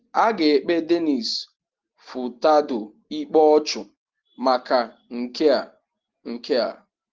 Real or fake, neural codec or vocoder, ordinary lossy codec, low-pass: real; none; Opus, 16 kbps; 7.2 kHz